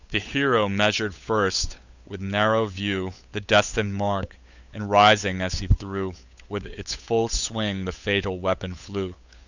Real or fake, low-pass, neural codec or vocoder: fake; 7.2 kHz; codec, 16 kHz, 8 kbps, FunCodec, trained on Chinese and English, 25 frames a second